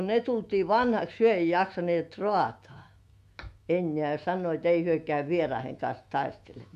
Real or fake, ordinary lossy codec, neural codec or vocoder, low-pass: fake; MP3, 64 kbps; autoencoder, 48 kHz, 128 numbers a frame, DAC-VAE, trained on Japanese speech; 14.4 kHz